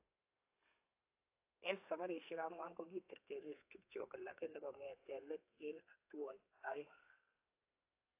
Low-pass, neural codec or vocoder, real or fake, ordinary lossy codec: 3.6 kHz; codec, 32 kHz, 1.9 kbps, SNAC; fake; AAC, 24 kbps